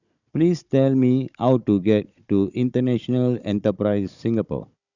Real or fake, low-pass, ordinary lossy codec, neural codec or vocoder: fake; 7.2 kHz; none; codec, 16 kHz, 16 kbps, FunCodec, trained on Chinese and English, 50 frames a second